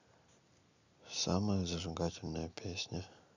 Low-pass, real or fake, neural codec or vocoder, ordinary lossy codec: 7.2 kHz; real; none; none